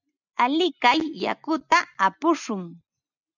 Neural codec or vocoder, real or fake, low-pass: none; real; 7.2 kHz